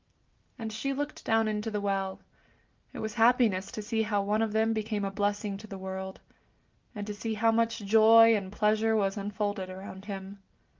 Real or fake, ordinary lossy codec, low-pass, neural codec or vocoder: real; Opus, 24 kbps; 7.2 kHz; none